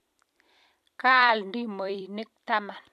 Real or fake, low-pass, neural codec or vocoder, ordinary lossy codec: fake; 14.4 kHz; vocoder, 44.1 kHz, 128 mel bands every 256 samples, BigVGAN v2; none